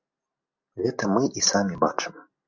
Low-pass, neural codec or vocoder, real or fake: 7.2 kHz; none; real